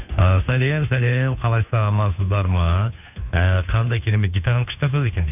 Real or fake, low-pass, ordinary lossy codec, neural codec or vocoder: fake; 3.6 kHz; none; codec, 16 kHz, 2 kbps, FunCodec, trained on Chinese and English, 25 frames a second